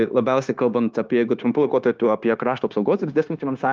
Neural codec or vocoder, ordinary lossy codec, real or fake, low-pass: codec, 16 kHz, 0.9 kbps, LongCat-Audio-Codec; Opus, 32 kbps; fake; 7.2 kHz